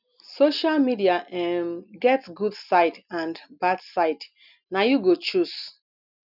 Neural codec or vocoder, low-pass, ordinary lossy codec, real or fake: none; 5.4 kHz; none; real